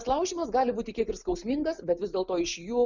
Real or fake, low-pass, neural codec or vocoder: real; 7.2 kHz; none